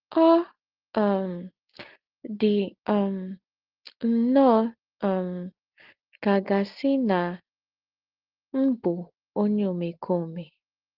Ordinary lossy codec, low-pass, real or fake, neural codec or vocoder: Opus, 16 kbps; 5.4 kHz; real; none